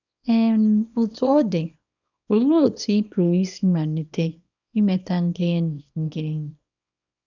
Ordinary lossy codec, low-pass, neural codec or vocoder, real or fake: none; 7.2 kHz; codec, 24 kHz, 0.9 kbps, WavTokenizer, small release; fake